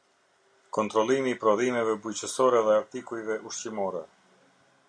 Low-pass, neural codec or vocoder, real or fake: 9.9 kHz; none; real